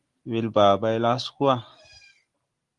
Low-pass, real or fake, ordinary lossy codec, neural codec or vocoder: 10.8 kHz; real; Opus, 24 kbps; none